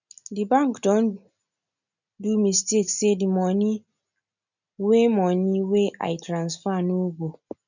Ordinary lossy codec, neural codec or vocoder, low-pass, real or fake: none; none; 7.2 kHz; real